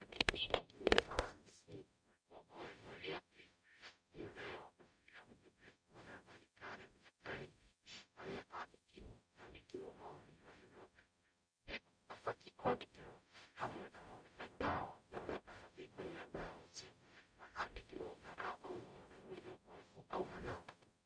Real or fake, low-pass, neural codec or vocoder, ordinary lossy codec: fake; 9.9 kHz; codec, 44.1 kHz, 0.9 kbps, DAC; MP3, 64 kbps